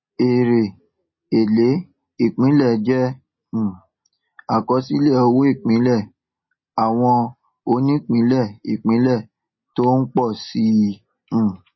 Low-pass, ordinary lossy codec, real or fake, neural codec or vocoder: 7.2 kHz; MP3, 24 kbps; real; none